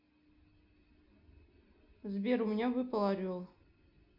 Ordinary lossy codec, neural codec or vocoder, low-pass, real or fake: none; none; 5.4 kHz; real